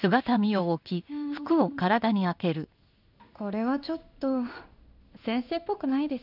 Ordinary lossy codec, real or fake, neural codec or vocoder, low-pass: none; fake; codec, 16 kHz in and 24 kHz out, 1 kbps, XY-Tokenizer; 5.4 kHz